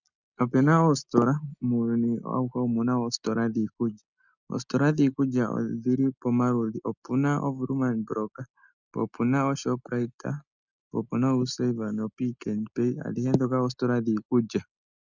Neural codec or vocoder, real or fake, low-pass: none; real; 7.2 kHz